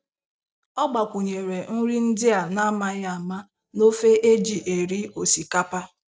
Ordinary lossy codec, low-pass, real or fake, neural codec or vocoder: none; none; real; none